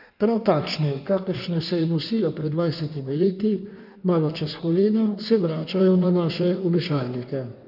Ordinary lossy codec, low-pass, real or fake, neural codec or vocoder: none; 5.4 kHz; fake; codec, 16 kHz in and 24 kHz out, 1.1 kbps, FireRedTTS-2 codec